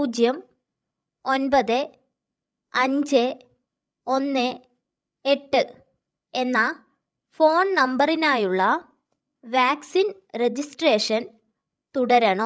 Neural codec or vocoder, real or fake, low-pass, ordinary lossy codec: codec, 16 kHz, 16 kbps, FreqCodec, larger model; fake; none; none